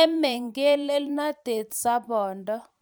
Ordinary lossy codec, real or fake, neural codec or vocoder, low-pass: none; fake; vocoder, 44.1 kHz, 128 mel bands every 512 samples, BigVGAN v2; none